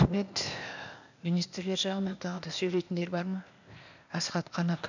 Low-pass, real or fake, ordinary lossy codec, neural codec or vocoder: 7.2 kHz; fake; none; codec, 16 kHz, 0.8 kbps, ZipCodec